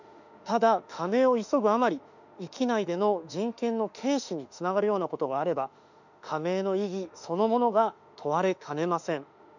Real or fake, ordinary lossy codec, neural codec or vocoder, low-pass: fake; none; autoencoder, 48 kHz, 32 numbers a frame, DAC-VAE, trained on Japanese speech; 7.2 kHz